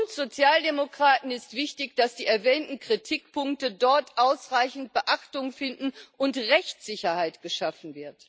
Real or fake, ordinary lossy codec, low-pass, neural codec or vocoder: real; none; none; none